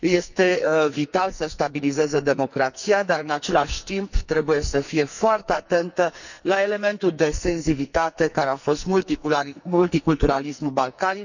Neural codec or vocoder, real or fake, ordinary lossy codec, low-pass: codec, 44.1 kHz, 2.6 kbps, SNAC; fake; none; 7.2 kHz